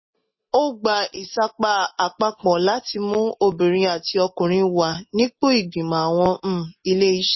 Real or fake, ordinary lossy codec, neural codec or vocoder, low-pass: real; MP3, 24 kbps; none; 7.2 kHz